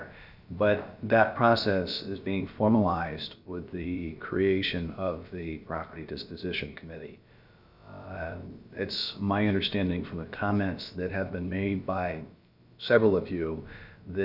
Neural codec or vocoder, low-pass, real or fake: codec, 16 kHz, about 1 kbps, DyCAST, with the encoder's durations; 5.4 kHz; fake